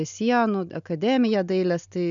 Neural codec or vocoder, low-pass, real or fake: none; 7.2 kHz; real